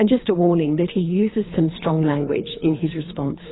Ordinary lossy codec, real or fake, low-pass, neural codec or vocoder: AAC, 16 kbps; fake; 7.2 kHz; codec, 24 kHz, 3 kbps, HILCodec